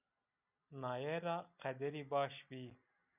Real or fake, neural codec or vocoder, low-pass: real; none; 3.6 kHz